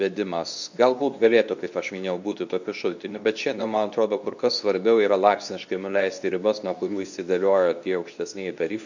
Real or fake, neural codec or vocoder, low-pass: fake; codec, 24 kHz, 0.9 kbps, WavTokenizer, medium speech release version 2; 7.2 kHz